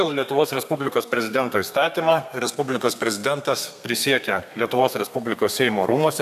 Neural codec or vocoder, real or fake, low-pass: codec, 32 kHz, 1.9 kbps, SNAC; fake; 14.4 kHz